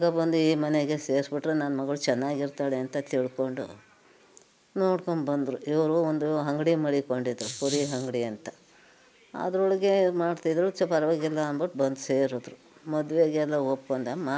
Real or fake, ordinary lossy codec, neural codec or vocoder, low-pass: real; none; none; none